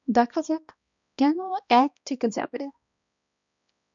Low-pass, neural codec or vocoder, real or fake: 7.2 kHz; codec, 16 kHz, 1 kbps, X-Codec, HuBERT features, trained on balanced general audio; fake